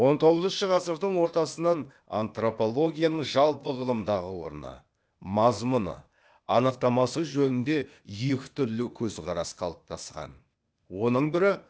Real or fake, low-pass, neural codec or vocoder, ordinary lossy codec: fake; none; codec, 16 kHz, 0.8 kbps, ZipCodec; none